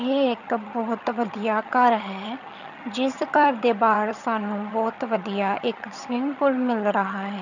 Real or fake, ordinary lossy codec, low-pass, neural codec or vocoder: fake; none; 7.2 kHz; vocoder, 22.05 kHz, 80 mel bands, HiFi-GAN